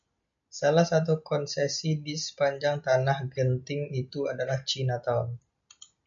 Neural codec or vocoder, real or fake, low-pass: none; real; 7.2 kHz